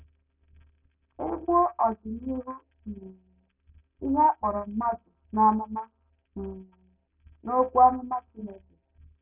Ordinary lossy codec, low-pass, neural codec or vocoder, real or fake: none; 3.6 kHz; none; real